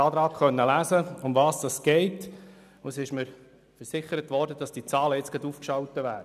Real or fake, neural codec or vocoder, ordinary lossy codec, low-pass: real; none; none; 14.4 kHz